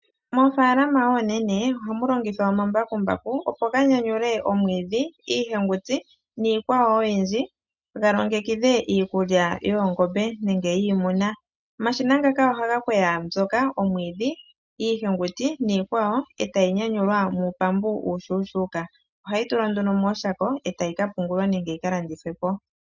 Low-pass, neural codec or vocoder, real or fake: 7.2 kHz; none; real